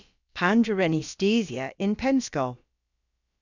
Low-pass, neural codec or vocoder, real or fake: 7.2 kHz; codec, 16 kHz, about 1 kbps, DyCAST, with the encoder's durations; fake